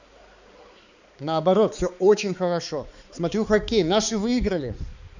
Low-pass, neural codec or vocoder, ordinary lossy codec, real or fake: 7.2 kHz; codec, 16 kHz, 4 kbps, X-Codec, HuBERT features, trained on balanced general audio; none; fake